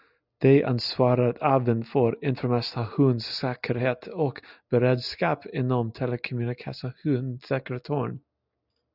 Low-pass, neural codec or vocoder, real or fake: 5.4 kHz; none; real